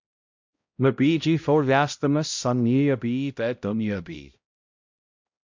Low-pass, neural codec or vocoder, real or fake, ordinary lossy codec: 7.2 kHz; codec, 16 kHz, 0.5 kbps, X-Codec, HuBERT features, trained on balanced general audio; fake; MP3, 64 kbps